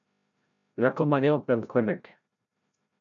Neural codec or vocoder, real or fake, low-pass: codec, 16 kHz, 0.5 kbps, FreqCodec, larger model; fake; 7.2 kHz